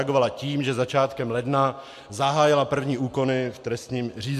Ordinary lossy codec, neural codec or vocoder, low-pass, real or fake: MP3, 64 kbps; none; 14.4 kHz; real